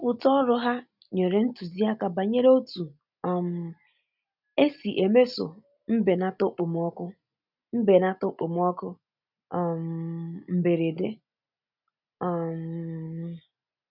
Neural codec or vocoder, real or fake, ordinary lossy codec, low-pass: none; real; none; 5.4 kHz